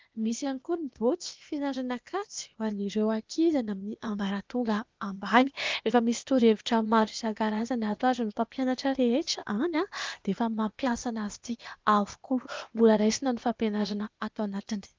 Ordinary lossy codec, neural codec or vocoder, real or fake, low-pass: Opus, 32 kbps; codec, 16 kHz, 0.8 kbps, ZipCodec; fake; 7.2 kHz